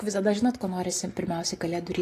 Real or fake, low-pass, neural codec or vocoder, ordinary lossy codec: real; 14.4 kHz; none; AAC, 48 kbps